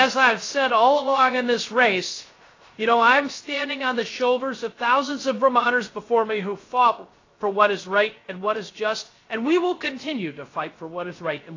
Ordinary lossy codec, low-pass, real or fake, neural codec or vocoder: AAC, 32 kbps; 7.2 kHz; fake; codec, 16 kHz, 0.3 kbps, FocalCodec